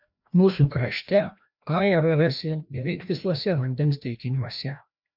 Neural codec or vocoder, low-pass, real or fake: codec, 16 kHz, 1 kbps, FreqCodec, larger model; 5.4 kHz; fake